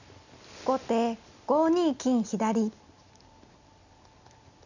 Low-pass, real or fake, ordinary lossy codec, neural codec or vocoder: 7.2 kHz; real; none; none